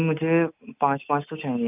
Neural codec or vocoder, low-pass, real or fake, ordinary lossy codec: none; 3.6 kHz; real; none